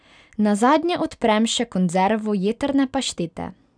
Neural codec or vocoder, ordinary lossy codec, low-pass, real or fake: none; none; 9.9 kHz; real